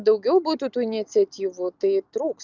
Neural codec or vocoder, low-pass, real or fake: none; 7.2 kHz; real